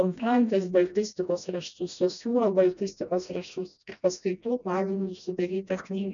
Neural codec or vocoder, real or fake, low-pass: codec, 16 kHz, 1 kbps, FreqCodec, smaller model; fake; 7.2 kHz